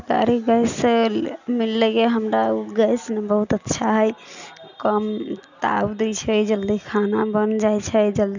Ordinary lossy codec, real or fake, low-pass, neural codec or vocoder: none; real; 7.2 kHz; none